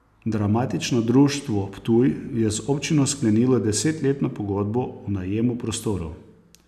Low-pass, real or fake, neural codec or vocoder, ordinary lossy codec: 14.4 kHz; real; none; none